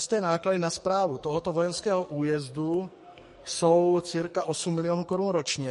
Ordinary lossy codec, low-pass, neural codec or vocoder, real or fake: MP3, 48 kbps; 14.4 kHz; codec, 44.1 kHz, 2.6 kbps, SNAC; fake